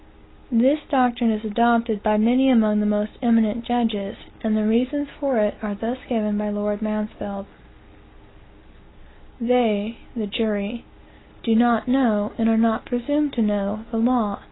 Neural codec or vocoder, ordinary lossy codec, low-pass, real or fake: none; AAC, 16 kbps; 7.2 kHz; real